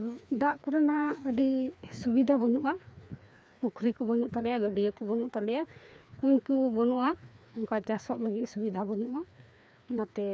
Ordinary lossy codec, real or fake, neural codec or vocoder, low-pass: none; fake; codec, 16 kHz, 2 kbps, FreqCodec, larger model; none